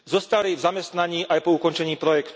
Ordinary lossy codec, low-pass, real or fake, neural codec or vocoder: none; none; real; none